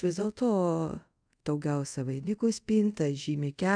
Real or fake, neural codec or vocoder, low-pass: fake; codec, 24 kHz, 0.5 kbps, DualCodec; 9.9 kHz